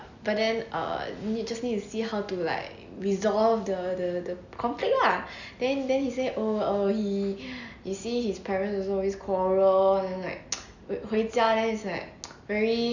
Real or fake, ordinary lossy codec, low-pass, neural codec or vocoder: real; none; 7.2 kHz; none